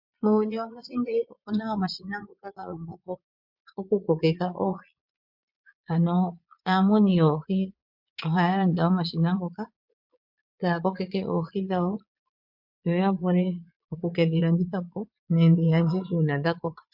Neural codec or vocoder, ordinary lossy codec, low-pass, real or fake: vocoder, 22.05 kHz, 80 mel bands, Vocos; MP3, 48 kbps; 5.4 kHz; fake